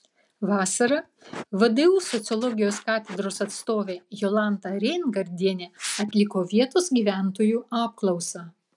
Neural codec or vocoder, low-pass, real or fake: none; 10.8 kHz; real